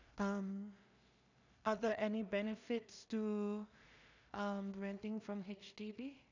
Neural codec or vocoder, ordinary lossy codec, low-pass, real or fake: codec, 16 kHz in and 24 kHz out, 0.4 kbps, LongCat-Audio-Codec, two codebook decoder; none; 7.2 kHz; fake